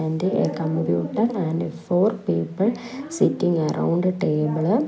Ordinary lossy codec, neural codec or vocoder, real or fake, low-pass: none; none; real; none